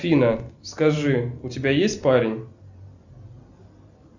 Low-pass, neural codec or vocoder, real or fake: 7.2 kHz; none; real